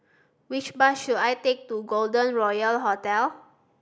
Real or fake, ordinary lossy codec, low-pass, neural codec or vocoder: real; none; none; none